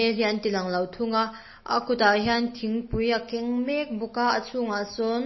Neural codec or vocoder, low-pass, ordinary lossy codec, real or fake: none; 7.2 kHz; MP3, 24 kbps; real